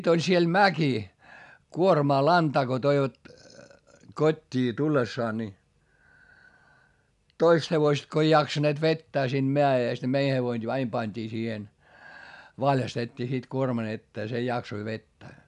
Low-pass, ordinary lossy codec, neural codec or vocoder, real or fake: 10.8 kHz; none; none; real